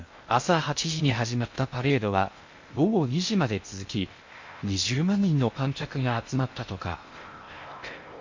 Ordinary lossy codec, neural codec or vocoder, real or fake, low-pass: MP3, 48 kbps; codec, 16 kHz in and 24 kHz out, 0.6 kbps, FocalCodec, streaming, 2048 codes; fake; 7.2 kHz